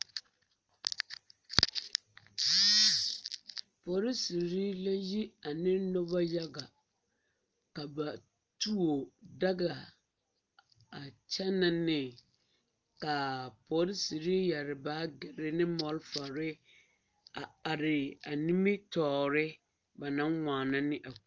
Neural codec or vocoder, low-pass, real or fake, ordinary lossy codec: none; 7.2 kHz; real; Opus, 24 kbps